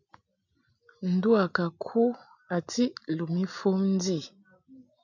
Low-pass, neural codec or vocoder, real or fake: 7.2 kHz; none; real